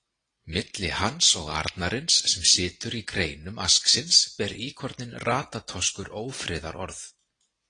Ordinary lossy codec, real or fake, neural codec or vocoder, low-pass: AAC, 32 kbps; real; none; 9.9 kHz